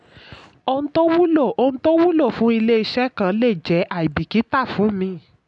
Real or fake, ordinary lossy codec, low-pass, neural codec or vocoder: real; none; 10.8 kHz; none